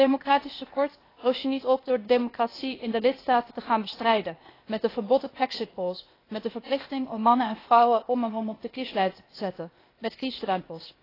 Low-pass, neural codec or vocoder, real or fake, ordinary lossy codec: 5.4 kHz; codec, 16 kHz, 0.8 kbps, ZipCodec; fake; AAC, 24 kbps